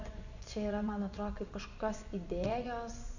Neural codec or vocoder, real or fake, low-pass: vocoder, 24 kHz, 100 mel bands, Vocos; fake; 7.2 kHz